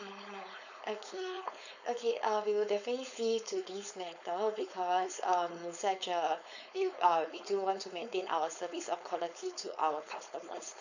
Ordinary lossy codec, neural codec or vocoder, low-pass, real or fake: none; codec, 16 kHz, 4.8 kbps, FACodec; 7.2 kHz; fake